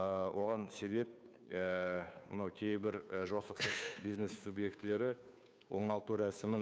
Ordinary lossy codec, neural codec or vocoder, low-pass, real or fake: none; codec, 16 kHz, 2 kbps, FunCodec, trained on Chinese and English, 25 frames a second; none; fake